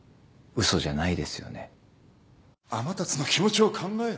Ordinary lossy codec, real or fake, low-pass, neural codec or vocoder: none; real; none; none